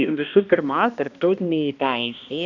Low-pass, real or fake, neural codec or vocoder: 7.2 kHz; fake; codec, 16 kHz, 1 kbps, X-Codec, HuBERT features, trained on balanced general audio